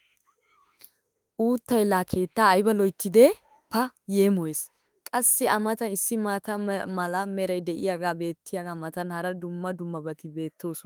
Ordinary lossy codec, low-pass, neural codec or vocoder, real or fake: Opus, 32 kbps; 19.8 kHz; autoencoder, 48 kHz, 32 numbers a frame, DAC-VAE, trained on Japanese speech; fake